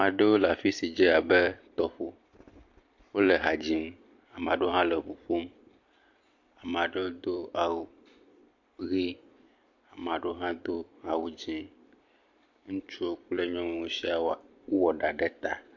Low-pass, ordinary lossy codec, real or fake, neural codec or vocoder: 7.2 kHz; MP3, 48 kbps; fake; vocoder, 44.1 kHz, 128 mel bands every 256 samples, BigVGAN v2